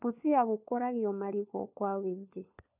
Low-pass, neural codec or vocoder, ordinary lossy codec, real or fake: 3.6 kHz; codec, 16 kHz, 4 kbps, FunCodec, trained on LibriTTS, 50 frames a second; none; fake